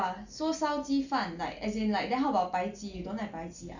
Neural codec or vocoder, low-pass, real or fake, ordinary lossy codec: none; 7.2 kHz; real; none